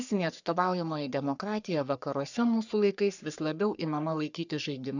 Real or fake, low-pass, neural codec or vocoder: fake; 7.2 kHz; codec, 44.1 kHz, 3.4 kbps, Pupu-Codec